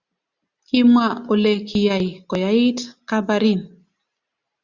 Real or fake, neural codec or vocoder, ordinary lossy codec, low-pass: real; none; Opus, 64 kbps; 7.2 kHz